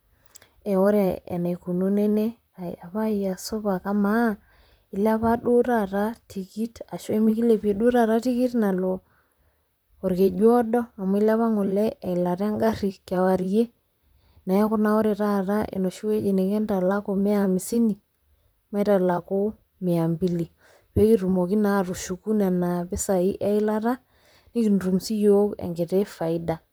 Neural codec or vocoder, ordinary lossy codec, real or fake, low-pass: vocoder, 44.1 kHz, 128 mel bands, Pupu-Vocoder; none; fake; none